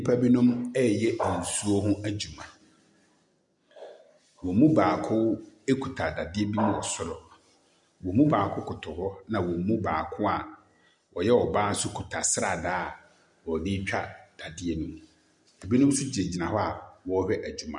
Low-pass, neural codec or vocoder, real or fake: 10.8 kHz; none; real